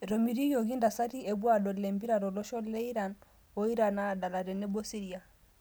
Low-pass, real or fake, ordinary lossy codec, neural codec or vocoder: none; real; none; none